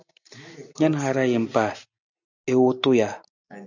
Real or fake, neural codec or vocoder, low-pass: real; none; 7.2 kHz